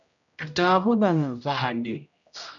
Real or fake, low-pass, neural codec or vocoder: fake; 7.2 kHz; codec, 16 kHz, 0.5 kbps, X-Codec, HuBERT features, trained on general audio